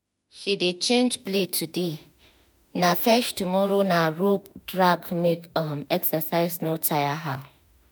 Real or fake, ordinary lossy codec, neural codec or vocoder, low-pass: fake; none; autoencoder, 48 kHz, 32 numbers a frame, DAC-VAE, trained on Japanese speech; none